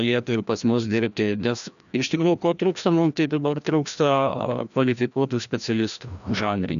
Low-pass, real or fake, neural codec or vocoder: 7.2 kHz; fake; codec, 16 kHz, 1 kbps, FreqCodec, larger model